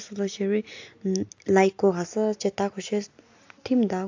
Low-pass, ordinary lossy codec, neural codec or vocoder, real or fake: 7.2 kHz; AAC, 48 kbps; none; real